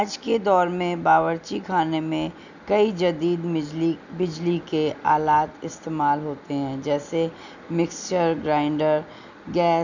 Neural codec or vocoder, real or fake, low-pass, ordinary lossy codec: none; real; 7.2 kHz; none